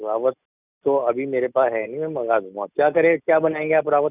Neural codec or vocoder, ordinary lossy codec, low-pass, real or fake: none; none; 3.6 kHz; real